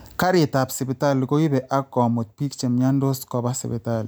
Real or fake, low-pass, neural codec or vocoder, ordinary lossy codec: real; none; none; none